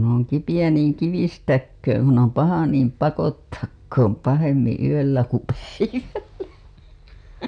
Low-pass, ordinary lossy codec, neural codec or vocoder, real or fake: 9.9 kHz; none; none; real